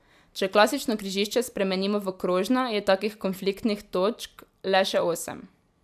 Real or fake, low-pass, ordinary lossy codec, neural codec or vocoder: real; 14.4 kHz; AAC, 96 kbps; none